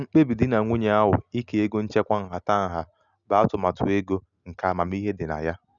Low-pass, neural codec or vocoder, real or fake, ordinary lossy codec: 7.2 kHz; none; real; none